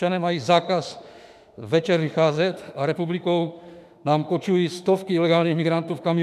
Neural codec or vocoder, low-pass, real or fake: autoencoder, 48 kHz, 32 numbers a frame, DAC-VAE, trained on Japanese speech; 14.4 kHz; fake